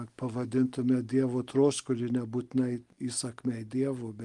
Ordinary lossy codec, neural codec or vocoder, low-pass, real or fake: Opus, 24 kbps; none; 10.8 kHz; real